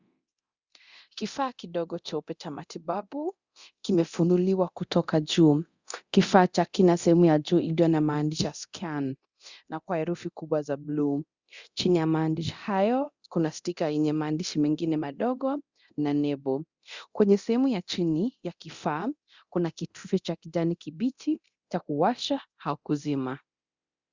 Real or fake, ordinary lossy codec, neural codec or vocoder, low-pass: fake; Opus, 64 kbps; codec, 24 kHz, 0.9 kbps, DualCodec; 7.2 kHz